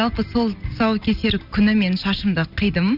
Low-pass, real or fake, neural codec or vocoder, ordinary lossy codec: 5.4 kHz; real; none; none